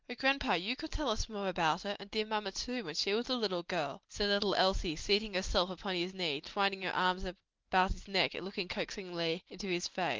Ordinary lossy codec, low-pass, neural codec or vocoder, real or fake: Opus, 32 kbps; 7.2 kHz; none; real